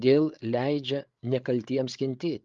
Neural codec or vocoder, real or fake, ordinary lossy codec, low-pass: codec, 16 kHz, 8 kbps, FreqCodec, larger model; fake; Opus, 32 kbps; 7.2 kHz